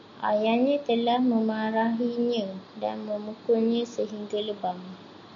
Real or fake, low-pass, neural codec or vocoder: real; 7.2 kHz; none